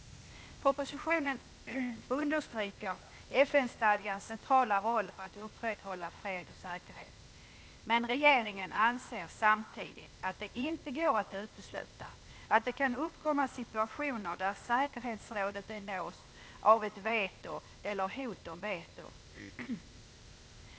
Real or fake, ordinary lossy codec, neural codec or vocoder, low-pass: fake; none; codec, 16 kHz, 0.8 kbps, ZipCodec; none